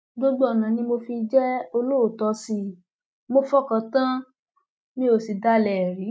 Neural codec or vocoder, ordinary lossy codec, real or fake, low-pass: none; none; real; none